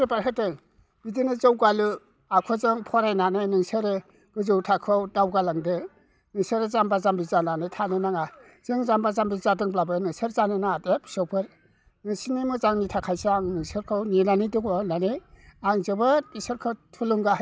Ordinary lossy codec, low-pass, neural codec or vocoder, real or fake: none; none; none; real